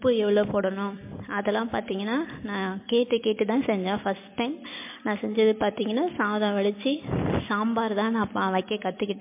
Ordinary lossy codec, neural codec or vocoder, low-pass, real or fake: MP3, 24 kbps; none; 3.6 kHz; real